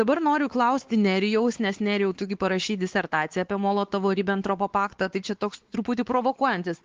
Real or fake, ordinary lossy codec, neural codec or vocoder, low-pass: fake; Opus, 16 kbps; codec, 16 kHz, 4 kbps, X-Codec, WavLM features, trained on Multilingual LibriSpeech; 7.2 kHz